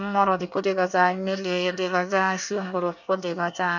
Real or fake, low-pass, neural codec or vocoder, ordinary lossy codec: fake; 7.2 kHz; codec, 24 kHz, 1 kbps, SNAC; none